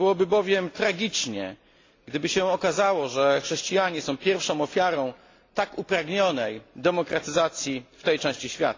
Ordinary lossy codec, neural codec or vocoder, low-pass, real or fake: AAC, 32 kbps; none; 7.2 kHz; real